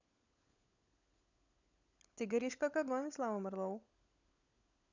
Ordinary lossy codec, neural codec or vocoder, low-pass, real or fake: none; codec, 16 kHz, 16 kbps, FunCodec, trained on LibriTTS, 50 frames a second; 7.2 kHz; fake